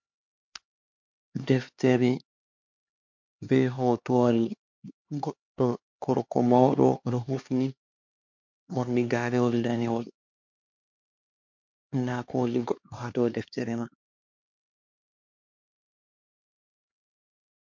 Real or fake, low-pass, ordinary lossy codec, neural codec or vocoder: fake; 7.2 kHz; MP3, 48 kbps; codec, 16 kHz, 2 kbps, X-Codec, HuBERT features, trained on LibriSpeech